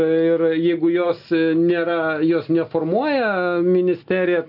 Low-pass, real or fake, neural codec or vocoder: 5.4 kHz; real; none